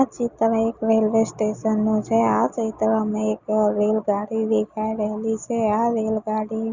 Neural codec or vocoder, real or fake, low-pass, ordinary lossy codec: none; real; 7.2 kHz; Opus, 64 kbps